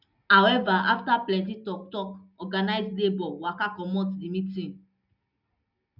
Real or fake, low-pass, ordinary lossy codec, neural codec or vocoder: real; 5.4 kHz; none; none